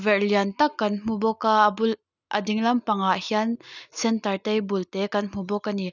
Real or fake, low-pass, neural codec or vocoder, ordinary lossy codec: real; 7.2 kHz; none; none